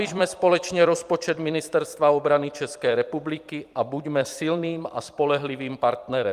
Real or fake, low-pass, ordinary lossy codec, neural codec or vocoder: fake; 14.4 kHz; Opus, 32 kbps; vocoder, 44.1 kHz, 128 mel bands every 256 samples, BigVGAN v2